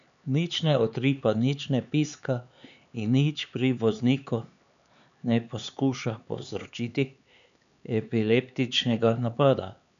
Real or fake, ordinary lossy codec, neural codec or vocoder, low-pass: fake; none; codec, 16 kHz, 4 kbps, X-Codec, HuBERT features, trained on LibriSpeech; 7.2 kHz